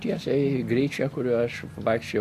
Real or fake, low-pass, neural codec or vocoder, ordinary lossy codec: real; 14.4 kHz; none; MP3, 64 kbps